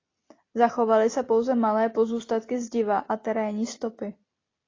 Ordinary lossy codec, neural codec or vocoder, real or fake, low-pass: AAC, 32 kbps; none; real; 7.2 kHz